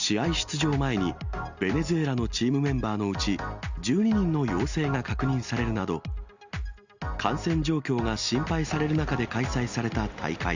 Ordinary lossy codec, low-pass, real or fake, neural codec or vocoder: Opus, 64 kbps; 7.2 kHz; real; none